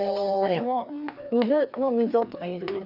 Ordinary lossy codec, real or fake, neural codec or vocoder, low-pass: none; fake; codec, 16 kHz, 2 kbps, FreqCodec, larger model; 5.4 kHz